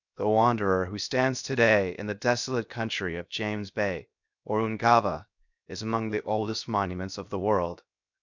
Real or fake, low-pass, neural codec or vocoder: fake; 7.2 kHz; codec, 16 kHz, 0.7 kbps, FocalCodec